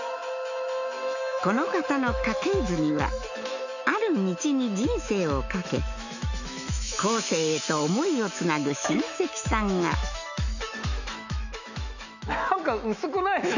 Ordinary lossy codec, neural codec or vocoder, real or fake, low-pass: none; autoencoder, 48 kHz, 128 numbers a frame, DAC-VAE, trained on Japanese speech; fake; 7.2 kHz